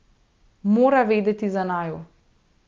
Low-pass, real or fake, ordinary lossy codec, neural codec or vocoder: 7.2 kHz; real; Opus, 32 kbps; none